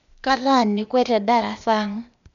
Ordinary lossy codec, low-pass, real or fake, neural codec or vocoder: none; 7.2 kHz; fake; codec, 16 kHz, 0.8 kbps, ZipCodec